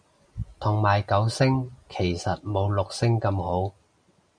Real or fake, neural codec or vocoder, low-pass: real; none; 9.9 kHz